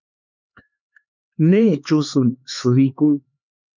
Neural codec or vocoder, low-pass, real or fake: codec, 16 kHz, 4 kbps, X-Codec, HuBERT features, trained on LibriSpeech; 7.2 kHz; fake